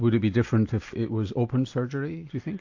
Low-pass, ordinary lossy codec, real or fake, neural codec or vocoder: 7.2 kHz; AAC, 48 kbps; real; none